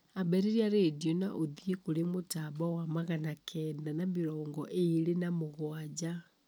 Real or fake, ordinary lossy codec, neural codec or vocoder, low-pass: real; none; none; none